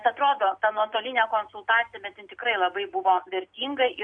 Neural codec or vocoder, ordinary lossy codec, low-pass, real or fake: none; AAC, 64 kbps; 9.9 kHz; real